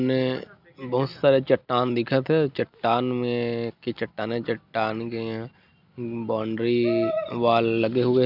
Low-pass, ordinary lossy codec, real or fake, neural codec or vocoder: 5.4 kHz; none; real; none